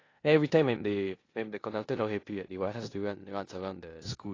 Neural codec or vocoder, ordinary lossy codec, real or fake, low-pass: codec, 16 kHz in and 24 kHz out, 0.9 kbps, LongCat-Audio-Codec, four codebook decoder; AAC, 32 kbps; fake; 7.2 kHz